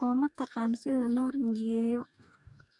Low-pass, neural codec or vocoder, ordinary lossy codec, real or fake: 10.8 kHz; codec, 44.1 kHz, 2.6 kbps, DAC; none; fake